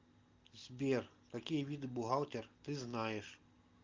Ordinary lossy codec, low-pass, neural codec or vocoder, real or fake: Opus, 32 kbps; 7.2 kHz; none; real